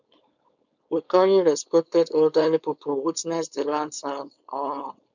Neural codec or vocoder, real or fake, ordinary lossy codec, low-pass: codec, 16 kHz, 4.8 kbps, FACodec; fake; none; 7.2 kHz